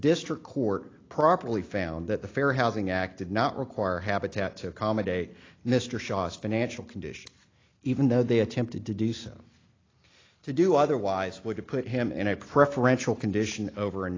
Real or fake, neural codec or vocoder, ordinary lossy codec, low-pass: real; none; AAC, 32 kbps; 7.2 kHz